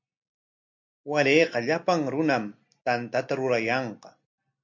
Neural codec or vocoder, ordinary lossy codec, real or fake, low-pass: none; MP3, 48 kbps; real; 7.2 kHz